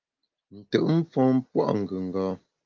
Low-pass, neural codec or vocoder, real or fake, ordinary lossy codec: 7.2 kHz; none; real; Opus, 32 kbps